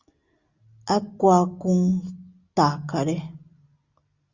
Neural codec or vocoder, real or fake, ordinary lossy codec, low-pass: none; real; Opus, 64 kbps; 7.2 kHz